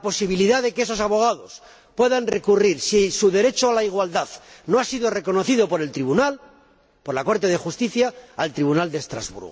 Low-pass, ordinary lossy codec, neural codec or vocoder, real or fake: none; none; none; real